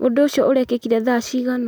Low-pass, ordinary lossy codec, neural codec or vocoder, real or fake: none; none; none; real